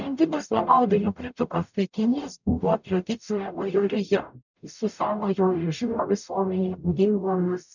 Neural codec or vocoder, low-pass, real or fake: codec, 44.1 kHz, 0.9 kbps, DAC; 7.2 kHz; fake